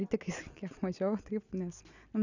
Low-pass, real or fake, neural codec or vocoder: 7.2 kHz; real; none